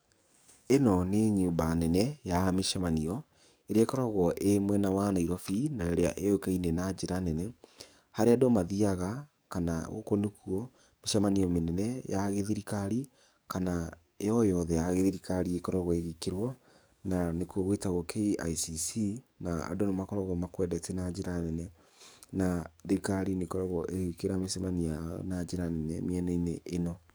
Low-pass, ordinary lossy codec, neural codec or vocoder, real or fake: none; none; codec, 44.1 kHz, 7.8 kbps, DAC; fake